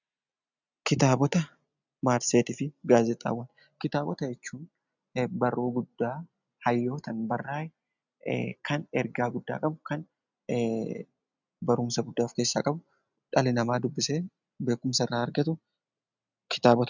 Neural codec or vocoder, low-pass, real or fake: none; 7.2 kHz; real